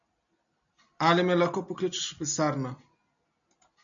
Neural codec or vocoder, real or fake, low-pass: none; real; 7.2 kHz